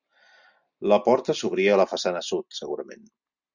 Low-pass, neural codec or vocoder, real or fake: 7.2 kHz; none; real